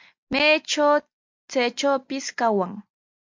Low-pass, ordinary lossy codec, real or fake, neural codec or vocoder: 7.2 kHz; MP3, 48 kbps; real; none